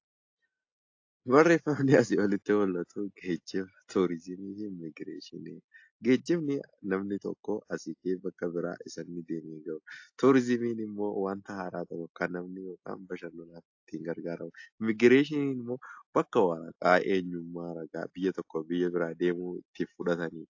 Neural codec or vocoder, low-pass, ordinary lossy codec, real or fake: none; 7.2 kHz; AAC, 48 kbps; real